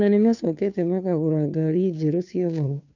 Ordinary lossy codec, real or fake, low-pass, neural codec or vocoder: none; fake; 7.2 kHz; codec, 16 kHz, 2 kbps, FunCodec, trained on Chinese and English, 25 frames a second